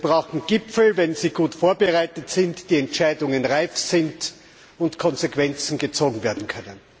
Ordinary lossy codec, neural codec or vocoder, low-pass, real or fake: none; none; none; real